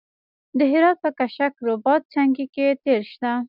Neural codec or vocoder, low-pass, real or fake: none; 5.4 kHz; real